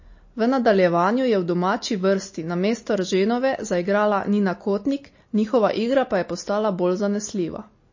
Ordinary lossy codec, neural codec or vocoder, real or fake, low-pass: MP3, 32 kbps; none; real; 7.2 kHz